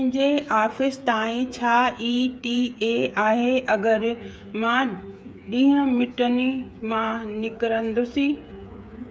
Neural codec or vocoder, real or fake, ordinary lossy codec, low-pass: codec, 16 kHz, 8 kbps, FreqCodec, smaller model; fake; none; none